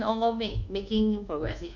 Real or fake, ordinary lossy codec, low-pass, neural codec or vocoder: fake; none; 7.2 kHz; codec, 24 kHz, 1.2 kbps, DualCodec